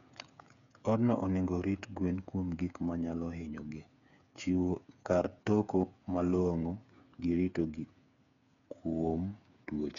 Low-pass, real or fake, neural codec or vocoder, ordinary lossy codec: 7.2 kHz; fake; codec, 16 kHz, 8 kbps, FreqCodec, smaller model; none